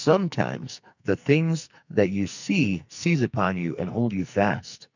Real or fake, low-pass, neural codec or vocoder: fake; 7.2 kHz; codec, 44.1 kHz, 2.6 kbps, SNAC